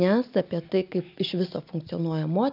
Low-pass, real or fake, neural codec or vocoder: 5.4 kHz; real; none